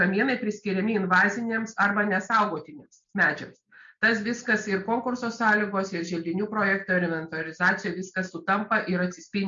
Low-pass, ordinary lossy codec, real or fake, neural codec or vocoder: 7.2 kHz; MP3, 48 kbps; real; none